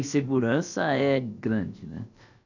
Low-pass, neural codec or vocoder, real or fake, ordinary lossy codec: 7.2 kHz; codec, 16 kHz, about 1 kbps, DyCAST, with the encoder's durations; fake; none